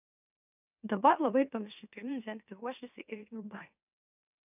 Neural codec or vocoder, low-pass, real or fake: autoencoder, 44.1 kHz, a latent of 192 numbers a frame, MeloTTS; 3.6 kHz; fake